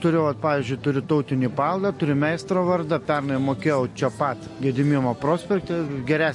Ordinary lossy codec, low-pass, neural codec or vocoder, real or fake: MP3, 48 kbps; 10.8 kHz; none; real